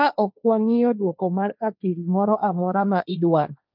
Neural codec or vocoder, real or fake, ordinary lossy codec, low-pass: codec, 16 kHz, 1.1 kbps, Voila-Tokenizer; fake; none; 5.4 kHz